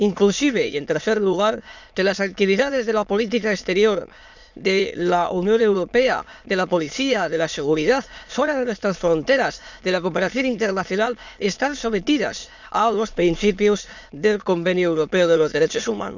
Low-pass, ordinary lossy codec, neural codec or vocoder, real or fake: 7.2 kHz; none; autoencoder, 22.05 kHz, a latent of 192 numbers a frame, VITS, trained on many speakers; fake